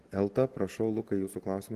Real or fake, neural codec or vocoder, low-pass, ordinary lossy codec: real; none; 14.4 kHz; Opus, 16 kbps